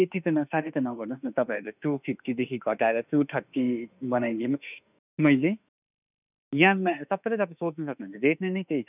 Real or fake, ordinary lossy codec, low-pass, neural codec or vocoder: fake; none; 3.6 kHz; autoencoder, 48 kHz, 32 numbers a frame, DAC-VAE, trained on Japanese speech